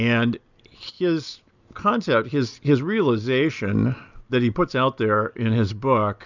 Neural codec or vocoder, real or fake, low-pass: none; real; 7.2 kHz